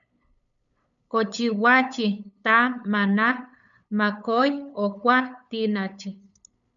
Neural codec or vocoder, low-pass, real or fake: codec, 16 kHz, 8 kbps, FunCodec, trained on LibriTTS, 25 frames a second; 7.2 kHz; fake